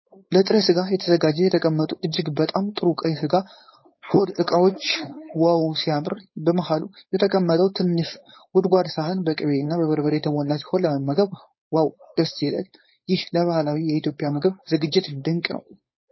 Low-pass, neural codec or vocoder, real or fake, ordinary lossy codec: 7.2 kHz; codec, 16 kHz, 4.8 kbps, FACodec; fake; MP3, 24 kbps